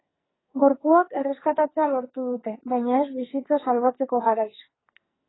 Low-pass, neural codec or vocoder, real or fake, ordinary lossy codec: 7.2 kHz; codec, 44.1 kHz, 2.6 kbps, SNAC; fake; AAC, 16 kbps